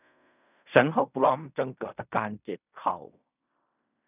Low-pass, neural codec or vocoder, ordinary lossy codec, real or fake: 3.6 kHz; codec, 16 kHz in and 24 kHz out, 0.4 kbps, LongCat-Audio-Codec, fine tuned four codebook decoder; none; fake